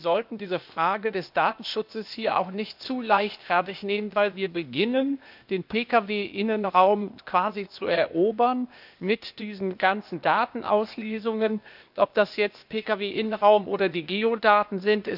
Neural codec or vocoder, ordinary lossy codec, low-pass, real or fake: codec, 16 kHz, 0.8 kbps, ZipCodec; none; 5.4 kHz; fake